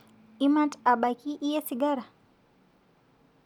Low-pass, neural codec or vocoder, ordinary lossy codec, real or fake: 19.8 kHz; none; none; real